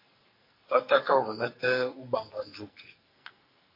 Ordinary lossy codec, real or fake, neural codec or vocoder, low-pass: MP3, 32 kbps; fake; codec, 44.1 kHz, 2.6 kbps, SNAC; 5.4 kHz